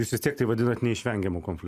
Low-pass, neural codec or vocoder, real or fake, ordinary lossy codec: 14.4 kHz; none; real; Opus, 64 kbps